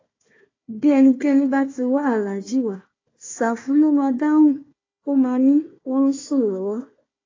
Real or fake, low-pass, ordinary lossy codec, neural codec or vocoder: fake; 7.2 kHz; AAC, 32 kbps; codec, 16 kHz, 1 kbps, FunCodec, trained on Chinese and English, 50 frames a second